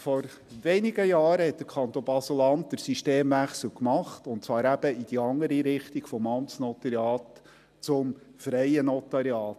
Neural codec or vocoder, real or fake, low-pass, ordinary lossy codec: none; real; 14.4 kHz; MP3, 96 kbps